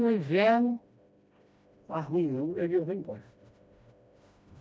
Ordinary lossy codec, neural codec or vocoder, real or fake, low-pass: none; codec, 16 kHz, 1 kbps, FreqCodec, smaller model; fake; none